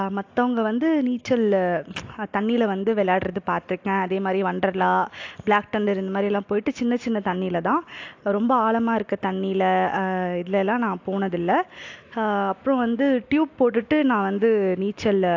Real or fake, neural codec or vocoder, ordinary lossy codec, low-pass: real; none; AAC, 48 kbps; 7.2 kHz